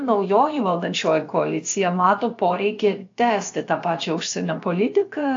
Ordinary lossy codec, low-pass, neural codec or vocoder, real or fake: AAC, 48 kbps; 7.2 kHz; codec, 16 kHz, about 1 kbps, DyCAST, with the encoder's durations; fake